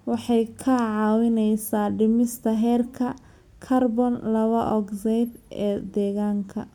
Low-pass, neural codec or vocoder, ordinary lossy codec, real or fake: 19.8 kHz; none; MP3, 96 kbps; real